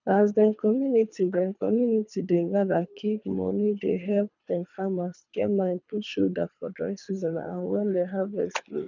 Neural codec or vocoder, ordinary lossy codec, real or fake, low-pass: codec, 24 kHz, 3 kbps, HILCodec; none; fake; 7.2 kHz